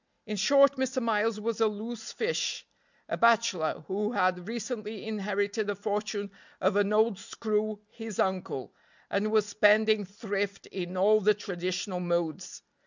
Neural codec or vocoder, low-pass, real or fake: none; 7.2 kHz; real